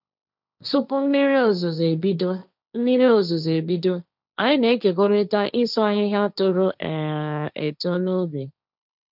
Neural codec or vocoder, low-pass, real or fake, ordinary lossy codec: codec, 16 kHz, 1.1 kbps, Voila-Tokenizer; 5.4 kHz; fake; none